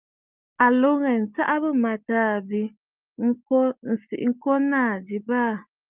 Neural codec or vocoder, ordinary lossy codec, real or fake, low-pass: none; Opus, 24 kbps; real; 3.6 kHz